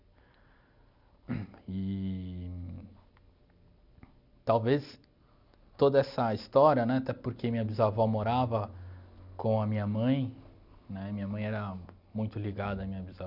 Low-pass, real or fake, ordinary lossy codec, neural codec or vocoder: 5.4 kHz; real; none; none